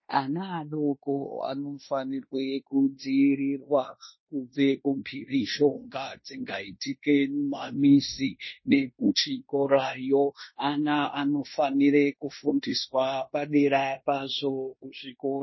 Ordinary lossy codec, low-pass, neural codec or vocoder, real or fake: MP3, 24 kbps; 7.2 kHz; codec, 16 kHz in and 24 kHz out, 0.9 kbps, LongCat-Audio-Codec, fine tuned four codebook decoder; fake